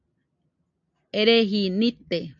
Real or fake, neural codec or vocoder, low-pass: real; none; 7.2 kHz